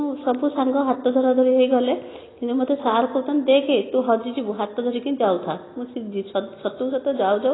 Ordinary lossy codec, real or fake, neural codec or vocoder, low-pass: AAC, 16 kbps; real; none; 7.2 kHz